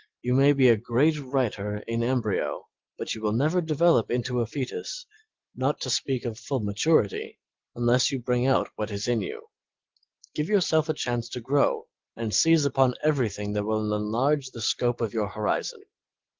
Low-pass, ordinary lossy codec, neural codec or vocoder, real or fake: 7.2 kHz; Opus, 16 kbps; none; real